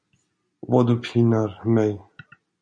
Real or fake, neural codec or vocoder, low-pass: real; none; 9.9 kHz